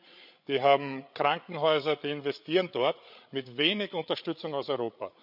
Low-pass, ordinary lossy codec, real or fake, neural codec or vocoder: 5.4 kHz; none; fake; codec, 16 kHz, 8 kbps, FreqCodec, larger model